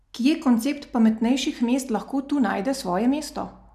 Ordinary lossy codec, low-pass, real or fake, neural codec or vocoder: none; 14.4 kHz; real; none